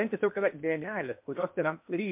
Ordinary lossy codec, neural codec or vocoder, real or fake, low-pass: MP3, 32 kbps; codec, 16 kHz in and 24 kHz out, 0.8 kbps, FocalCodec, streaming, 65536 codes; fake; 3.6 kHz